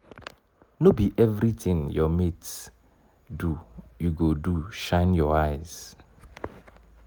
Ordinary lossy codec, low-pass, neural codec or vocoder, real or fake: none; none; none; real